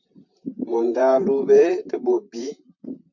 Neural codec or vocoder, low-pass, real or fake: vocoder, 44.1 kHz, 128 mel bands, Pupu-Vocoder; 7.2 kHz; fake